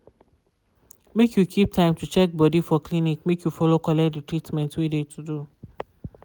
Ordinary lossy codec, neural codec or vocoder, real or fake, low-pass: none; none; real; none